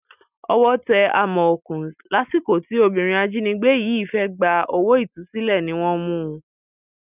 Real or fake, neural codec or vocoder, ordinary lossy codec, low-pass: real; none; none; 3.6 kHz